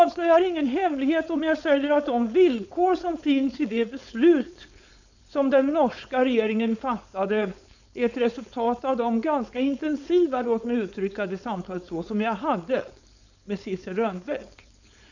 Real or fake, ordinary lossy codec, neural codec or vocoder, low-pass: fake; none; codec, 16 kHz, 4.8 kbps, FACodec; 7.2 kHz